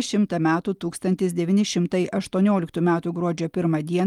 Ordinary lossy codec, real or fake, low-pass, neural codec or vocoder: Opus, 32 kbps; real; 19.8 kHz; none